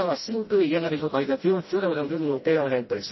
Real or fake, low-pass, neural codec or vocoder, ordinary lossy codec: fake; 7.2 kHz; codec, 16 kHz, 0.5 kbps, FreqCodec, smaller model; MP3, 24 kbps